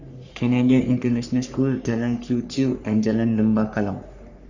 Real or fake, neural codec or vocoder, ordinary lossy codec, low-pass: fake; codec, 44.1 kHz, 3.4 kbps, Pupu-Codec; Opus, 64 kbps; 7.2 kHz